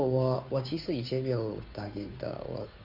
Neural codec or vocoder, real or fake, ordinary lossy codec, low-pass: codec, 16 kHz, 2 kbps, FunCodec, trained on Chinese and English, 25 frames a second; fake; none; 5.4 kHz